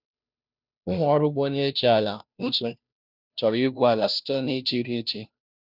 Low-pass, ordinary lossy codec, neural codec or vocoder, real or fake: 5.4 kHz; none; codec, 16 kHz, 0.5 kbps, FunCodec, trained on Chinese and English, 25 frames a second; fake